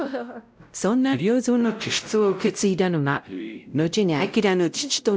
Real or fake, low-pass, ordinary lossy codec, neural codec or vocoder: fake; none; none; codec, 16 kHz, 0.5 kbps, X-Codec, WavLM features, trained on Multilingual LibriSpeech